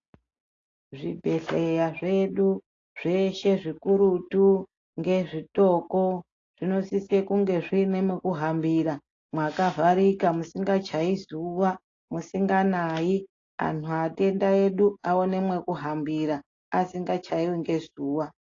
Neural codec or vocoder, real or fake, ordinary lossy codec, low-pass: none; real; AAC, 32 kbps; 7.2 kHz